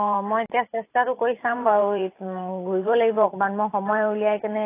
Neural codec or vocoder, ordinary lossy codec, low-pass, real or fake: vocoder, 44.1 kHz, 128 mel bands every 512 samples, BigVGAN v2; AAC, 24 kbps; 3.6 kHz; fake